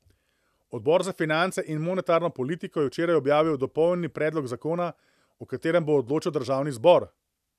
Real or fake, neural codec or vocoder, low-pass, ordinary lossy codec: real; none; 14.4 kHz; none